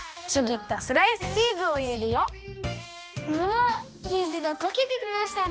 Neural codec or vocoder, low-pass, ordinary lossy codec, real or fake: codec, 16 kHz, 1 kbps, X-Codec, HuBERT features, trained on balanced general audio; none; none; fake